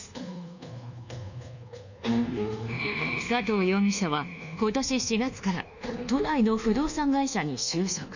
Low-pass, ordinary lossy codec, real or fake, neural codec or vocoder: 7.2 kHz; none; fake; codec, 24 kHz, 1.2 kbps, DualCodec